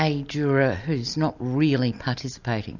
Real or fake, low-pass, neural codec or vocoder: real; 7.2 kHz; none